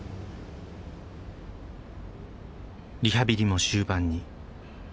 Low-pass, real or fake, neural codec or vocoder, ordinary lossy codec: none; real; none; none